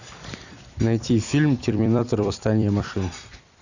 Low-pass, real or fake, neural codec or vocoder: 7.2 kHz; fake; vocoder, 44.1 kHz, 128 mel bands every 256 samples, BigVGAN v2